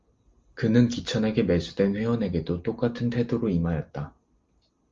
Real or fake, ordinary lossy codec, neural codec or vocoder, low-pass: real; Opus, 32 kbps; none; 7.2 kHz